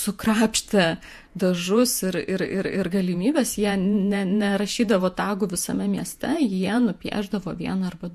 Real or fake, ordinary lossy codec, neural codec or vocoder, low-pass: fake; MP3, 64 kbps; vocoder, 48 kHz, 128 mel bands, Vocos; 14.4 kHz